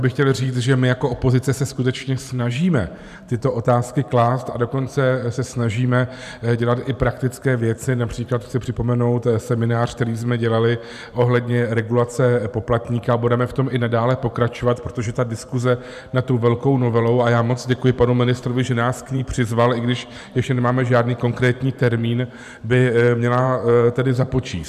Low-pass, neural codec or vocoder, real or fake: 14.4 kHz; vocoder, 44.1 kHz, 128 mel bands every 512 samples, BigVGAN v2; fake